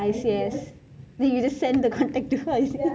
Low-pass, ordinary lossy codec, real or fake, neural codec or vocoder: none; none; real; none